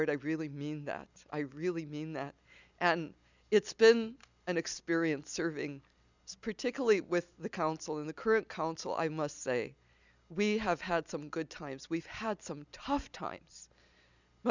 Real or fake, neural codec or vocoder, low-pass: real; none; 7.2 kHz